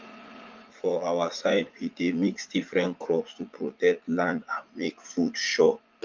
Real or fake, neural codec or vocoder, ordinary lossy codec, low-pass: fake; vocoder, 44.1 kHz, 80 mel bands, Vocos; Opus, 24 kbps; 7.2 kHz